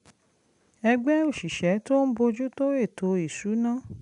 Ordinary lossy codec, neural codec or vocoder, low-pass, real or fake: none; none; 10.8 kHz; real